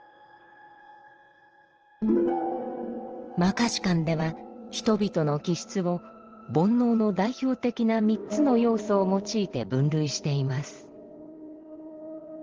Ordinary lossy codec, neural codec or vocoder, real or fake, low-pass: Opus, 16 kbps; vocoder, 22.05 kHz, 80 mel bands, WaveNeXt; fake; 7.2 kHz